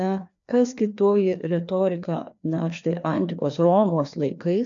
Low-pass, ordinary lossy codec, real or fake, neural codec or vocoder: 7.2 kHz; MP3, 64 kbps; fake; codec, 16 kHz, 2 kbps, FreqCodec, larger model